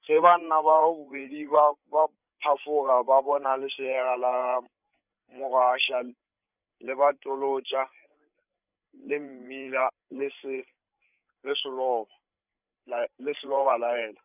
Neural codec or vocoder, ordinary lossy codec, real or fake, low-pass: codec, 16 kHz, 8 kbps, FreqCodec, larger model; none; fake; 3.6 kHz